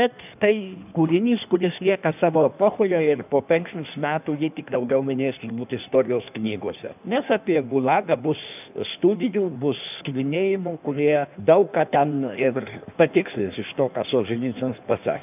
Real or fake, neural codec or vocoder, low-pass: fake; codec, 16 kHz in and 24 kHz out, 1.1 kbps, FireRedTTS-2 codec; 3.6 kHz